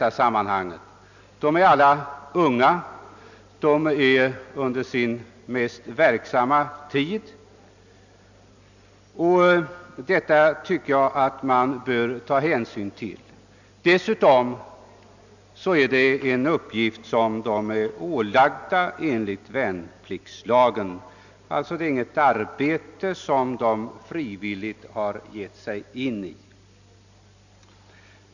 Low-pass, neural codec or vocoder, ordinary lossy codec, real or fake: 7.2 kHz; none; none; real